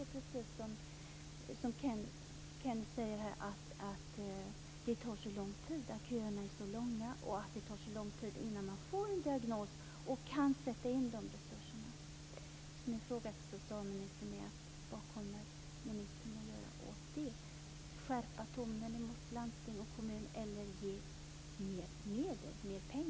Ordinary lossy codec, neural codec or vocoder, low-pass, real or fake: none; none; none; real